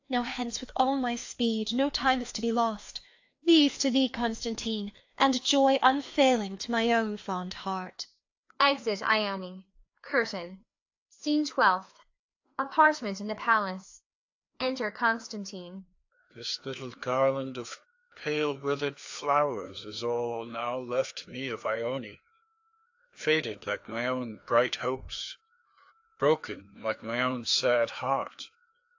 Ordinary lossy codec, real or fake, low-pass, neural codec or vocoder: AAC, 48 kbps; fake; 7.2 kHz; codec, 16 kHz, 2 kbps, FreqCodec, larger model